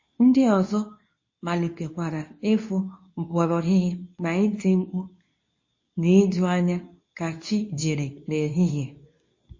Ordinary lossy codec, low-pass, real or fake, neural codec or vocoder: MP3, 32 kbps; 7.2 kHz; fake; codec, 24 kHz, 0.9 kbps, WavTokenizer, medium speech release version 2